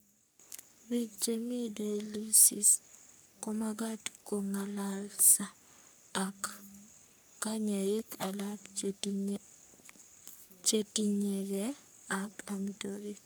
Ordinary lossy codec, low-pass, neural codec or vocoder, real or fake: none; none; codec, 44.1 kHz, 3.4 kbps, Pupu-Codec; fake